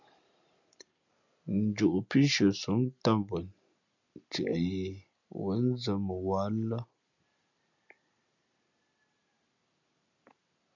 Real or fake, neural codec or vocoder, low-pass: real; none; 7.2 kHz